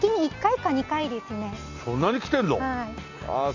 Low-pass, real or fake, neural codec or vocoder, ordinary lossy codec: 7.2 kHz; real; none; AAC, 48 kbps